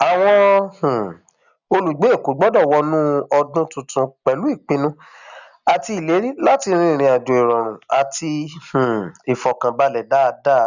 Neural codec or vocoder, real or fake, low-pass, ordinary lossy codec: none; real; 7.2 kHz; none